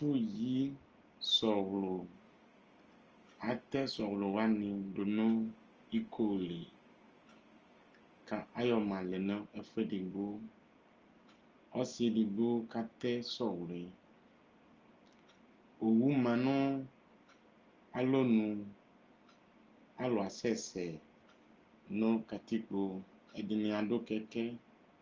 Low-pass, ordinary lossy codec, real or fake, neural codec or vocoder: 7.2 kHz; Opus, 24 kbps; real; none